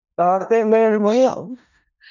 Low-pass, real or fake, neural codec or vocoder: 7.2 kHz; fake; codec, 16 kHz in and 24 kHz out, 0.4 kbps, LongCat-Audio-Codec, four codebook decoder